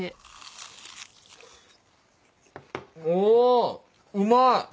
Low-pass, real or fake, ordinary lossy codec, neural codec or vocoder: none; real; none; none